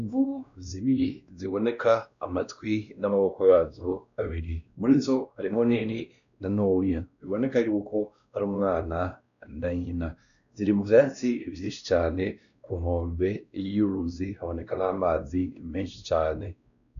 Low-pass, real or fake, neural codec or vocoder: 7.2 kHz; fake; codec, 16 kHz, 1 kbps, X-Codec, WavLM features, trained on Multilingual LibriSpeech